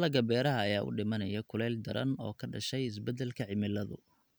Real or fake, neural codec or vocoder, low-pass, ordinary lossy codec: real; none; none; none